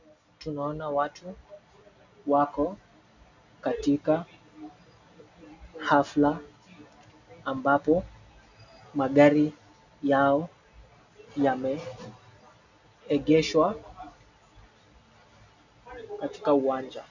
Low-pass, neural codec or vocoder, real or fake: 7.2 kHz; none; real